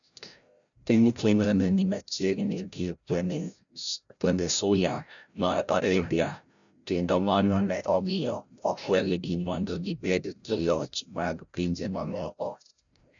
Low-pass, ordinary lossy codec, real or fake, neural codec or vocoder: 7.2 kHz; none; fake; codec, 16 kHz, 0.5 kbps, FreqCodec, larger model